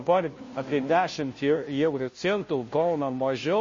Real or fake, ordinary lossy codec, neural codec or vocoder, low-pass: fake; MP3, 32 kbps; codec, 16 kHz, 0.5 kbps, FunCodec, trained on Chinese and English, 25 frames a second; 7.2 kHz